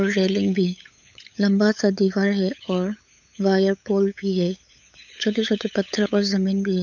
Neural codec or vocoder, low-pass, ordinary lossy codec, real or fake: codec, 16 kHz, 8 kbps, FunCodec, trained on LibriTTS, 25 frames a second; 7.2 kHz; none; fake